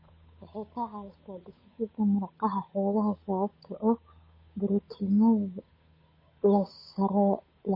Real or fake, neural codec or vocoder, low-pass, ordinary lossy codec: fake; codec, 16 kHz, 8 kbps, FunCodec, trained on Chinese and English, 25 frames a second; 5.4 kHz; MP3, 24 kbps